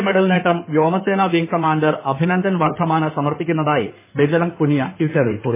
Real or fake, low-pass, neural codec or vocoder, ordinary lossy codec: fake; 3.6 kHz; codec, 16 kHz in and 24 kHz out, 2.2 kbps, FireRedTTS-2 codec; MP3, 16 kbps